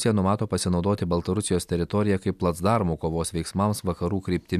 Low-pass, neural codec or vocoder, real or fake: 14.4 kHz; none; real